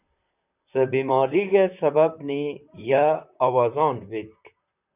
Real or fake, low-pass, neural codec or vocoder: fake; 3.6 kHz; vocoder, 44.1 kHz, 128 mel bands, Pupu-Vocoder